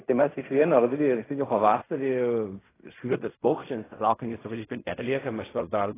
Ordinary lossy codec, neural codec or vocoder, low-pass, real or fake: AAC, 16 kbps; codec, 16 kHz in and 24 kHz out, 0.4 kbps, LongCat-Audio-Codec, fine tuned four codebook decoder; 3.6 kHz; fake